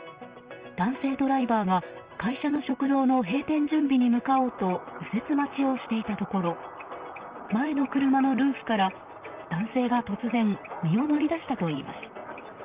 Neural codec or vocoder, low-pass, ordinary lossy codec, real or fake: vocoder, 44.1 kHz, 128 mel bands, Pupu-Vocoder; 3.6 kHz; Opus, 24 kbps; fake